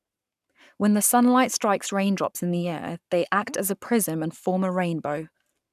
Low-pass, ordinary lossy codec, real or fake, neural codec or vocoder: 14.4 kHz; none; fake; codec, 44.1 kHz, 7.8 kbps, Pupu-Codec